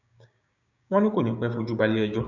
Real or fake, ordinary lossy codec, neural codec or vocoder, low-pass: fake; none; codec, 16 kHz, 6 kbps, DAC; 7.2 kHz